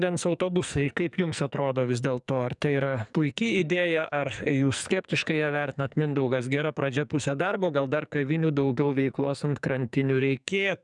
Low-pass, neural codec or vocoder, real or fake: 10.8 kHz; codec, 44.1 kHz, 2.6 kbps, SNAC; fake